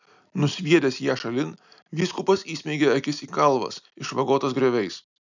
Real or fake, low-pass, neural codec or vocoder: real; 7.2 kHz; none